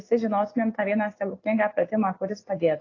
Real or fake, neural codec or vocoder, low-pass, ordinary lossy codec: real; none; 7.2 kHz; AAC, 32 kbps